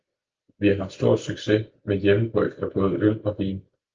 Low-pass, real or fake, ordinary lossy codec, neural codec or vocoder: 7.2 kHz; real; Opus, 24 kbps; none